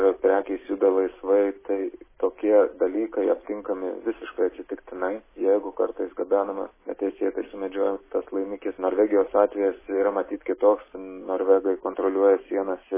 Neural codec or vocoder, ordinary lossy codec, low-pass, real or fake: none; MP3, 16 kbps; 3.6 kHz; real